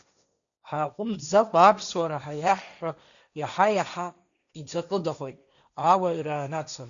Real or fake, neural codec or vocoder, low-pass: fake; codec, 16 kHz, 1.1 kbps, Voila-Tokenizer; 7.2 kHz